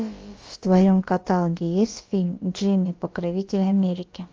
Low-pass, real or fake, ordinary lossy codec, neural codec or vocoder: 7.2 kHz; fake; Opus, 24 kbps; codec, 16 kHz, about 1 kbps, DyCAST, with the encoder's durations